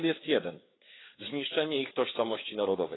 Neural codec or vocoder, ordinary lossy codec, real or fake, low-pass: codec, 16 kHz, 4 kbps, FreqCodec, larger model; AAC, 16 kbps; fake; 7.2 kHz